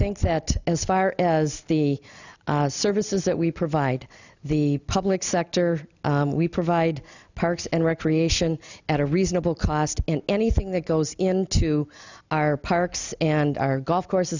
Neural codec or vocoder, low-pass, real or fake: none; 7.2 kHz; real